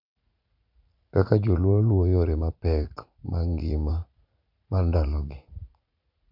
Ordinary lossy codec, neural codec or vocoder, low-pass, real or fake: none; vocoder, 44.1 kHz, 80 mel bands, Vocos; 5.4 kHz; fake